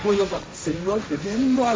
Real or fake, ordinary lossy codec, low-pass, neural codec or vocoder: fake; none; none; codec, 16 kHz, 1.1 kbps, Voila-Tokenizer